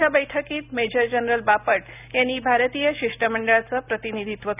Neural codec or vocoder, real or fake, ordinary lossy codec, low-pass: none; real; none; 3.6 kHz